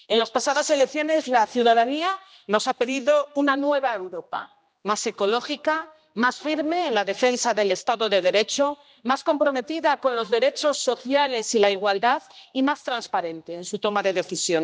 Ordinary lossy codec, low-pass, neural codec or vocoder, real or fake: none; none; codec, 16 kHz, 1 kbps, X-Codec, HuBERT features, trained on general audio; fake